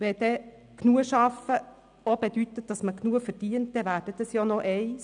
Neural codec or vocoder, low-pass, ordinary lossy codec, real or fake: none; 9.9 kHz; none; real